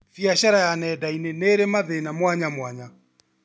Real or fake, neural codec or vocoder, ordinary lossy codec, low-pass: real; none; none; none